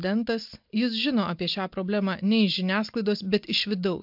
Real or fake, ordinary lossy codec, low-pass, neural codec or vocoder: real; MP3, 48 kbps; 5.4 kHz; none